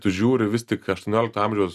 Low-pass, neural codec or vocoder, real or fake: 14.4 kHz; none; real